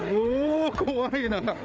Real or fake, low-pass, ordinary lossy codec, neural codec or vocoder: fake; none; none; codec, 16 kHz, 8 kbps, FreqCodec, larger model